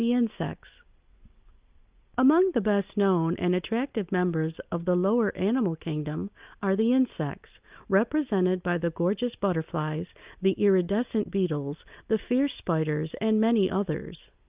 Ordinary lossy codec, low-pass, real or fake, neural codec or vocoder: Opus, 24 kbps; 3.6 kHz; real; none